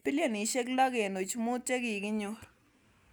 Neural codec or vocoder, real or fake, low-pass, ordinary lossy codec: none; real; none; none